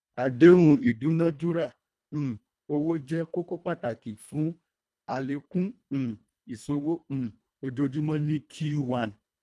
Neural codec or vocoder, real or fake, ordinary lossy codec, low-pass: codec, 24 kHz, 1.5 kbps, HILCodec; fake; none; none